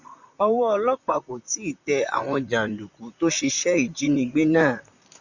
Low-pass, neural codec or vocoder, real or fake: 7.2 kHz; vocoder, 44.1 kHz, 128 mel bands, Pupu-Vocoder; fake